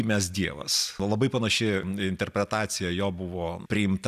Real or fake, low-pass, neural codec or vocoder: real; 14.4 kHz; none